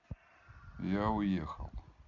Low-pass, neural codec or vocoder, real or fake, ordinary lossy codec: 7.2 kHz; none; real; MP3, 64 kbps